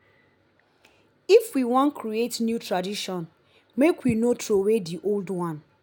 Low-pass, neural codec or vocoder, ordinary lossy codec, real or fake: 19.8 kHz; none; none; real